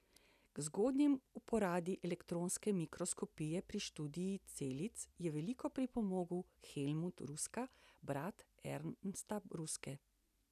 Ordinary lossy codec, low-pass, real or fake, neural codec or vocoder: none; 14.4 kHz; real; none